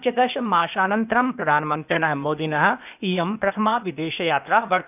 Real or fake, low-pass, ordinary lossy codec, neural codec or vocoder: fake; 3.6 kHz; none; codec, 16 kHz, 0.8 kbps, ZipCodec